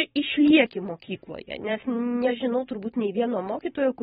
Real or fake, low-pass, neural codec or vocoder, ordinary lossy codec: fake; 19.8 kHz; vocoder, 44.1 kHz, 128 mel bands every 512 samples, BigVGAN v2; AAC, 16 kbps